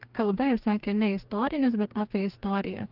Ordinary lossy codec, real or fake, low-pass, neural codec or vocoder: Opus, 32 kbps; fake; 5.4 kHz; codec, 44.1 kHz, 2.6 kbps, DAC